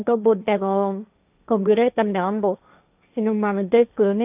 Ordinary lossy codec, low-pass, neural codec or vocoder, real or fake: AAC, 32 kbps; 3.6 kHz; codec, 16 kHz, 1.1 kbps, Voila-Tokenizer; fake